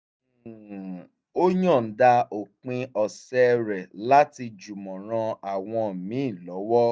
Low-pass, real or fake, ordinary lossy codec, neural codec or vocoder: none; real; none; none